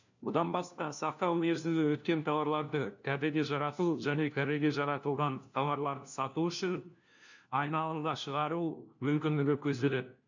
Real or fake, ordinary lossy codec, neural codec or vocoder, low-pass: fake; none; codec, 16 kHz, 1 kbps, FunCodec, trained on LibriTTS, 50 frames a second; 7.2 kHz